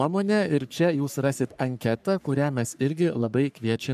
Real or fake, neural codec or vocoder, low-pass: fake; codec, 44.1 kHz, 3.4 kbps, Pupu-Codec; 14.4 kHz